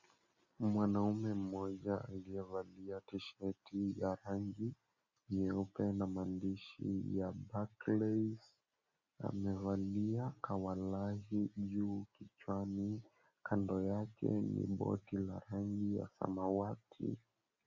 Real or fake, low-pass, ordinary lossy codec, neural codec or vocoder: real; 7.2 kHz; Opus, 64 kbps; none